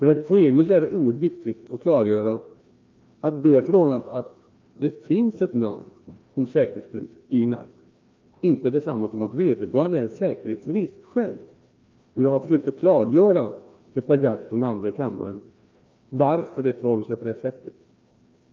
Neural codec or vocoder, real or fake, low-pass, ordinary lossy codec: codec, 16 kHz, 1 kbps, FreqCodec, larger model; fake; 7.2 kHz; Opus, 24 kbps